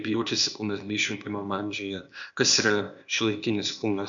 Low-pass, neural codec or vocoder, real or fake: 7.2 kHz; codec, 16 kHz, 0.8 kbps, ZipCodec; fake